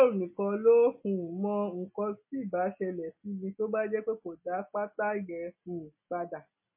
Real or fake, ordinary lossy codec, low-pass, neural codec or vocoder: real; MP3, 24 kbps; 3.6 kHz; none